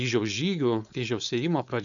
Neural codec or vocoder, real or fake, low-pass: codec, 16 kHz, 4.8 kbps, FACodec; fake; 7.2 kHz